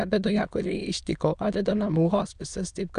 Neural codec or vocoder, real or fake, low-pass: autoencoder, 22.05 kHz, a latent of 192 numbers a frame, VITS, trained on many speakers; fake; 9.9 kHz